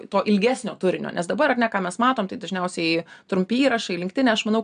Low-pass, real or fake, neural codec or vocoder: 9.9 kHz; real; none